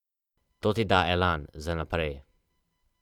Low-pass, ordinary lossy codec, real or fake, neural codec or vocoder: 19.8 kHz; none; fake; vocoder, 44.1 kHz, 128 mel bands every 512 samples, BigVGAN v2